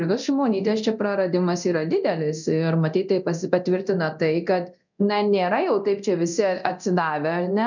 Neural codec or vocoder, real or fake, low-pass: codec, 24 kHz, 0.9 kbps, DualCodec; fake; 7.2 kHz